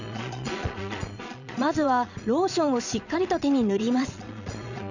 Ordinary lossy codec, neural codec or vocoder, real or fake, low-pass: none; vocoder, 22.05 kHz, 80 mel bands, Vocos; fake; 7.2 kHz